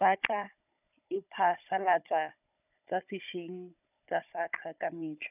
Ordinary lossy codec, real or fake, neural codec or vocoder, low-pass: none; fake; codec, 16 kHz, 16 kbps, FunCodec, trained on Chinese and English, 50 frames a second; 3.6 kHz